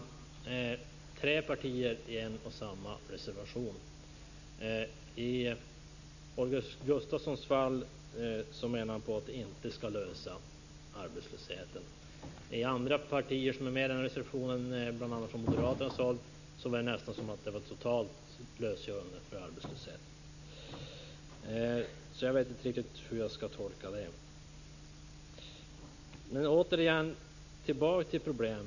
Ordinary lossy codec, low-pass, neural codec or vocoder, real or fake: none; 7.2 kHz; none; real